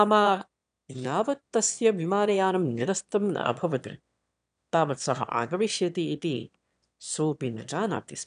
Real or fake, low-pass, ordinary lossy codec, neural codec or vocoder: fake; 9.9 kHz; none; autoencoder, 22.05 kHz, a latent of 192 numbers a frame, VITS, trained on one speaker